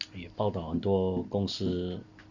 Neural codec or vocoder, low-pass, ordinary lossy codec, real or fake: none; 7.2 kHz; Opus, 64 kbps; real